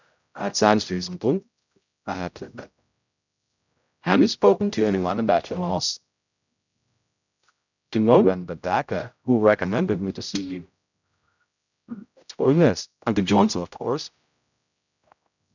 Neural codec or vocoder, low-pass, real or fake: codec, 16 kHz, 0.5 kbps, X-Codec, HuBERT features, trained on general audio; 7.2 kHz; fake